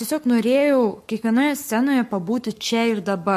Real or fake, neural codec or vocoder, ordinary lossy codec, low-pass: fake; codec, 44.1 kHz, 7.8 kbps, Pupu-Codec; MP3, 64 kbps; 14.4 kHz